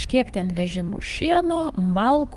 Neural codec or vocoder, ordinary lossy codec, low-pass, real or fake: codec, 24 kHz, 1 kbps, SNAC; Opus, 32 kbps; 10.8 kHz; fake